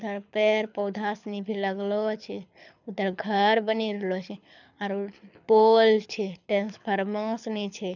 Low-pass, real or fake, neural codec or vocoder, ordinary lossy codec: 7.2 kHz; fake; codec, 24 kHz, 6 kbps, HILCodec; none